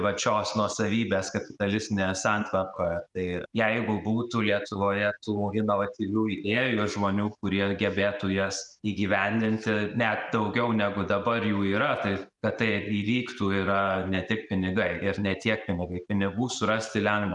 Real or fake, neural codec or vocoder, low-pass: real; none; 10.8 kHz